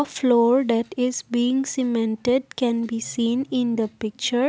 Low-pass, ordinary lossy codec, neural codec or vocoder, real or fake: none; none; none; real